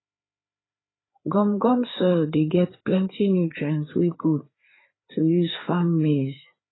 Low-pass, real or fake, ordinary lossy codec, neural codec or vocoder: 7.2 kHz; fake; AAC, 16 kbps; codec, 16 kHz, 4 kbps, FreqCodec, larger model